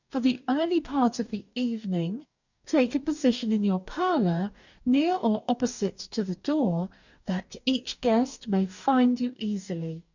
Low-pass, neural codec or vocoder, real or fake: 7.2 kHz; codec, 44.1 kHz, 2.6 kbps, DAC; fake